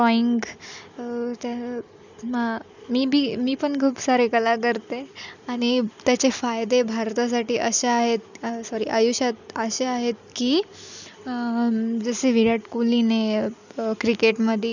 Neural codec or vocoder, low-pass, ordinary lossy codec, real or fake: none; 7.2 kHz; none; real